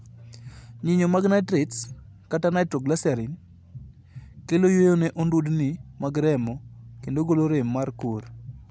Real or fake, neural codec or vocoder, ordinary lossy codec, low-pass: real; none; none; none